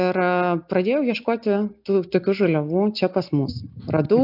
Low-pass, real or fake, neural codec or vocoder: 5.4 kHz; real; none